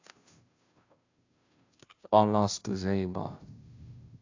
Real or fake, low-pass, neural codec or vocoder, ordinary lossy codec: fake; 7.2 kHz; codec, 16 kHz, 0.5 kbps, FunCodec, trained on Chinese and English, 25 frames a second; AAC, 48 kbps